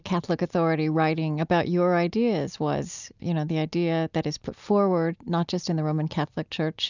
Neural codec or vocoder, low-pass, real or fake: none; 7.2 kHz; real